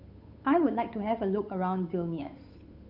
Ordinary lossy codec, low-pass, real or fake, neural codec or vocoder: none; 5.4 kHz; fake; codec, 16 kHz, 8 kbps, FunCodec, trained on Chinese and English, 25 frames a second